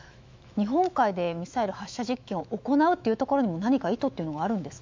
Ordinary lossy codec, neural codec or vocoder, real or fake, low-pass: MP3, 64 kbps; autoencoder, 48 kHz, 128 numbers a frame, DAC-VAE, trained on Japanese speech; fake; 7.2 kHz